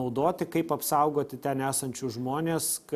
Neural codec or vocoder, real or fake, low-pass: none; real; 14.4 kHz